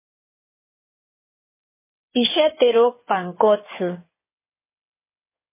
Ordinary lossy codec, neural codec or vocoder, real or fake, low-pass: MP3, 16 kbps; codec, 16 kHz in and 24 kHz out, 2.2 kbps, FireRedTTS-2 codec; fake; 3.6 kHz